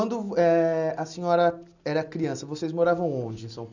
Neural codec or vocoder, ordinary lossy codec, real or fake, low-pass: none; none; real; 7.2 kHz